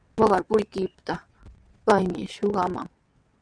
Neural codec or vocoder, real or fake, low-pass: vocoder, 22.05 kHz, 80 mel bands, WaveNeXt; fake; 9.9 kHz